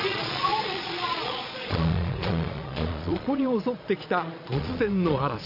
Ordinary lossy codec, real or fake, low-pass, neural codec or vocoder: none; fake; 5.4 kHz; vocoder, 22.05 kHz, 80 mel bands, Vocos